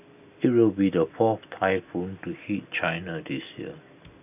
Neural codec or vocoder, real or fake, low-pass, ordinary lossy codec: none; real; 3.6 kHz; none